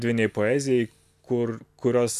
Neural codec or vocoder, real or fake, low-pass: none; real; 14.4 kHz